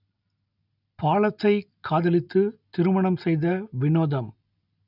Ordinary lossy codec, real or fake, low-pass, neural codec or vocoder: none; real; 5.4 kHz; none